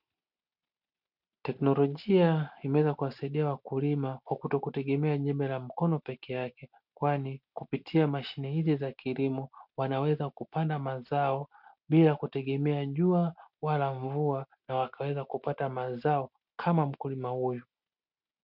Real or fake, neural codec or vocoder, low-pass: real; none; 5.4 kHz